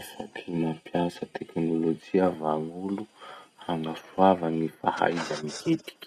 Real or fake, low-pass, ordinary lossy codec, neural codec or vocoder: real; none; none; none